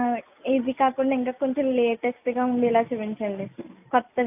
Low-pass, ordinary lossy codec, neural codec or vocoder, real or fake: 3.6 kHz; AAC, 32 kbps; none; real